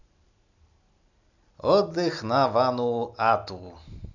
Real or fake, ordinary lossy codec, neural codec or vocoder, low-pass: real; none; none; 7.2 kHz